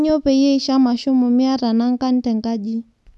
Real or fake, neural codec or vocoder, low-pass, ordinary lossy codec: real; none; none; none